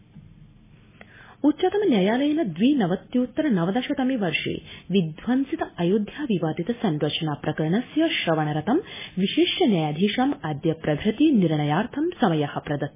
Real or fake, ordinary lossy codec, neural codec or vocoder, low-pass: real; MP3, 16 kbps; none; 3.6 kHz